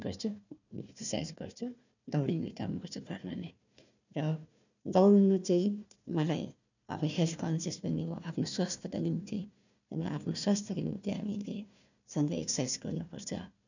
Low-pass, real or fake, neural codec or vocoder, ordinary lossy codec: 7.2 kHz; fake; codec, 16 kHz, 1 kbps, FunCodec, trained on Chinese and English, 50 frames a second; none